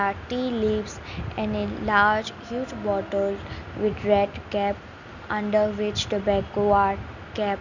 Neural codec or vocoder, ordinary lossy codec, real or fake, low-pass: none; none; real; 7.2 kHz